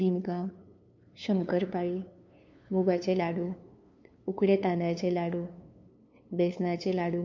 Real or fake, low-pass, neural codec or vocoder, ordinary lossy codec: fake; 7.2 kHz; codec, 16 kHz, 2 kbps, FunCodec, trained on LibriTTS, 25 frames a second; MP3, 64 kbps